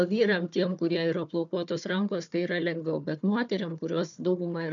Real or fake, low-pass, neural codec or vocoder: fake; 7.2 kHz; codec, 16 kHz, 4 kbps, FunCodec, trained on Chinese and English, 50 frames a second